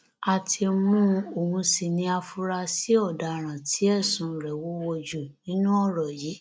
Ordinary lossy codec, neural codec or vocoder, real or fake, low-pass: none; none; real; none